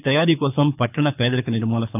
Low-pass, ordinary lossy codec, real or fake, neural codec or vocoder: 3.6 kHz; none; fake; codec, 24 kHz, 6 kbps, HILCodec